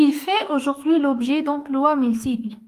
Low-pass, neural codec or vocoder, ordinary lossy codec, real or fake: 14.4 kHz; autoencoder, 48 kHz, 32 numbers a frame, DAC-VAE, trained on Japanese speech; Opus, 32 kbps; fake